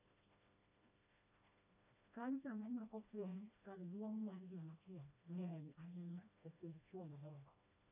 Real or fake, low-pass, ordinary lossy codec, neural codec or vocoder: fake; 3.6 kHz; none; codec, 16 kHz, 1 kbps, FreqCodec, smaller model